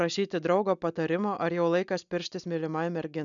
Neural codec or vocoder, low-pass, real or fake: none; 7.2 kHz; real